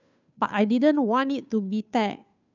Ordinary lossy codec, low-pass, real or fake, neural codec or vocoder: none; 7.2 kHz; fake; codec, 16 kHz, 2 kbps, FunCodec, trained on Chinese and English, 25 frames a second